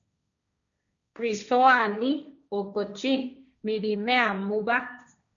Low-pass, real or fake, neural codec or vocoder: 7.2 kHz; fake; codec, 16 kHz, 1.1 kbps, Voila-Tokenizer